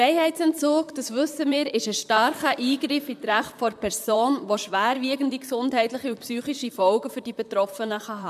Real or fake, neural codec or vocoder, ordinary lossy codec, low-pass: fake; vocoder, 44.1 kHz, 128 mel bands, Pupu-Vocoder; MP3, 96 kbps; 14.4 kHz